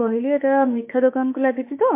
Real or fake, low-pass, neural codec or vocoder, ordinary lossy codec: fake; 3.6 kHz; autoencoder, 48 kHz, 32 numbers a frame, DAC-VAE, trained on Japanese speech; MP3, 24 kbps